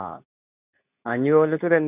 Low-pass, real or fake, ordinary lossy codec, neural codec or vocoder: 3.6 kHz; fake; none; codec, 24 kHz, 0.9 kbps, WavTokenizer, medium speech release version 2